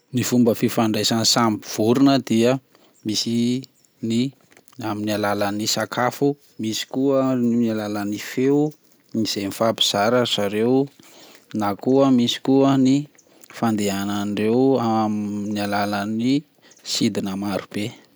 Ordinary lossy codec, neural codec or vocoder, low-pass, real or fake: none; none; none; real